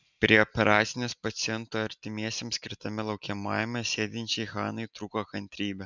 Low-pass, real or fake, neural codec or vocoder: 7.2 kHz; real; none